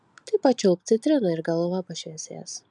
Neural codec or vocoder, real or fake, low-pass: none; real; 10.8 kHz